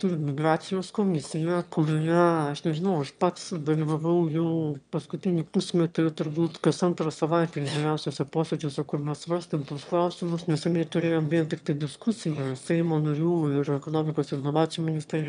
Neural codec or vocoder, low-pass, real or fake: autoencoder, 22.05 kHz, a latent of 192 numbers a frame, VITS, trained on one speaker; 9.9 kHz; fake